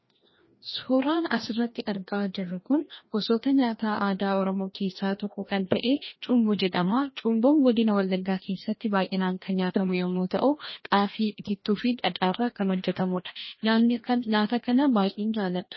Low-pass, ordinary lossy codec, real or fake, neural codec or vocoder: 7.2 kHz; MP3, 24 kbps; fake; codec, 16 kHz, 1 kbps, FreqCodec, larger model